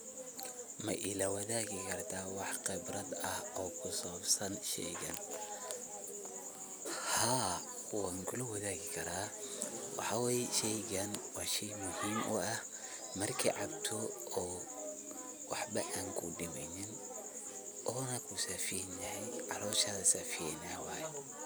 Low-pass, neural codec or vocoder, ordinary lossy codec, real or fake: none; none; none; real